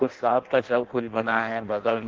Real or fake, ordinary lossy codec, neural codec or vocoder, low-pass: fake; Opus, 16 kbps; codec, 16 kHz in and 24 kHz out, 0.6 kbps, FireRedTTS-2 codec; 7.2 kHz